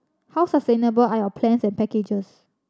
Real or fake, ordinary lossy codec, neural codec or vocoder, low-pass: real; none; none; none